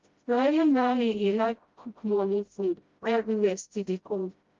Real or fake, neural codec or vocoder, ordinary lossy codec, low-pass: fake; codec, 16 kHz, 0.5 kbps, FreqCodec, smaller model; Opus, 32 kbps; 7.2 kHz